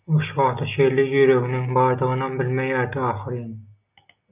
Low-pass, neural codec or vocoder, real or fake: 3.6 kHz; none; real